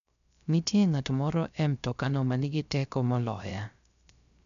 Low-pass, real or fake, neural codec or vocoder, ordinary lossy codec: 7.2 kHz; fake; codec, 16 kHz, 0.3 kbps, FocalCodec; none